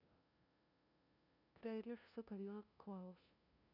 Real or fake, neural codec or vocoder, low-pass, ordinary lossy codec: fake; codec, 16 kHz, 0.5 kbps, FunCodec, trained on LibriTTS, 25 frames a second; 5.4 kHz; none